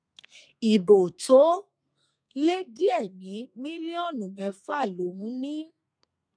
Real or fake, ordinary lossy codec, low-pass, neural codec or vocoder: fake; none; 9.9 kHz; codec, 32 kHz, 1.9 kbps, SNAC